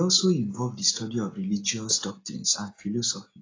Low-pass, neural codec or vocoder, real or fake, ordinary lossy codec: 7.2 kHz; none; real; AAC, 32 kbps